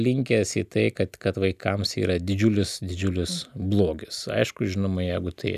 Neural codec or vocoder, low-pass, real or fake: none; 14.4 kHz; real